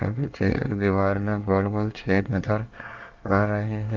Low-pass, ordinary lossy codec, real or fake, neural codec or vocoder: 7.2 kHz; Opus, 24 kbps; fake; codec, 24 kHz, 1 kbps, SNAC